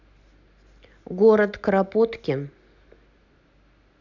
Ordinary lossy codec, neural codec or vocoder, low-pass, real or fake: none; none; 7.2 kHz; real